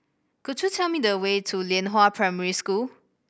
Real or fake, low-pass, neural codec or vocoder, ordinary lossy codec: real; none; none; none